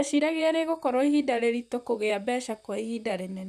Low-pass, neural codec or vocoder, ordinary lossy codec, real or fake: 14.4 kHz; vocoder, 44.1 kHz, 128 mel bands, Pupu-Vocoder; none; fake